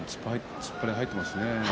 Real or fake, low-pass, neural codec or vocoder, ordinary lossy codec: real; none; none; none